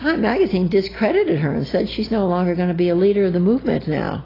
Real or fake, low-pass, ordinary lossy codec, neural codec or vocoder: real; 5.4 kHz; AAC, 24 kbps; none